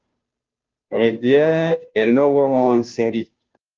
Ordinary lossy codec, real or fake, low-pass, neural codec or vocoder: Opus, 24 kbps; fake; 7.2 kHz; codec, 16 kHz, 0.5 kbps, FunCodec, trained on Chinese and English, 25 frames a second